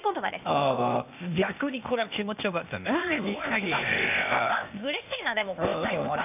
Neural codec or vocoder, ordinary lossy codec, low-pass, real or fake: codec, 16 kHz, 0.8 kbps, ZipCodec; none; 3.6 kHz; fake